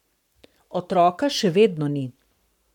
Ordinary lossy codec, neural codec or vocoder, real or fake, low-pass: none; codec, 44.1 kHz, 7.8 kbps, Pupu-Codec; fake; 19.8 kHz